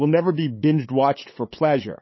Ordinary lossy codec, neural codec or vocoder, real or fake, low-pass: MP3, 24 kbps; codec, 16 kHz, 8 kbps, FunCodec, trained on LibriTTS, 25 frames a second; fake; 7.2 kHz